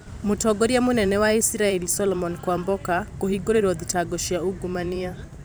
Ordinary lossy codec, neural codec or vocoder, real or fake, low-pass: none; vocoder, 44.1 kHz, 128 mel bands every 512 samples, BigVGAN v2; fake; none